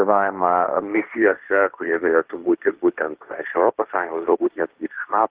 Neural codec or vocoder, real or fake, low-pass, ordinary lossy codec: codec, 16 kHz, 1.1 kbps, Voila-Tokenizer; fake; 3.6 kHz; Opus, 16 kbps